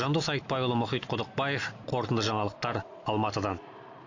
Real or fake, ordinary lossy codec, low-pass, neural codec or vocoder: real; AAC, 48 kbps; 7.2 kHz; none